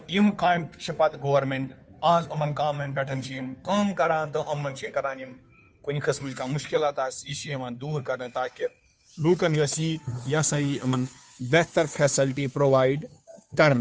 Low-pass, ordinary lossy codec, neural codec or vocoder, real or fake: none; none; codec, 16 kHz, 2 kbps, FunCodec, trained on Chinese and English, 25 frames a second; fake